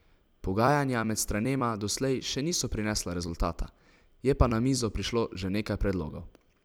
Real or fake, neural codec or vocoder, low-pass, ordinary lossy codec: fake; vocoder, 44.1 kHz, 128 mel bands every 256 samples, BigVGAN v2; none; none